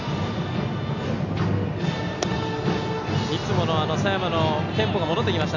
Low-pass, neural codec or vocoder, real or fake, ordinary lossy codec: 7.2 kHz; none; real; none